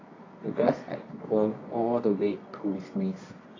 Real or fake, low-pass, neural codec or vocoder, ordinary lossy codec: fake; 7.2 kHz; codec, 24 kHz, 0.9 kbps, WavTokenizer, medium music audio release; AAC, 32 kbps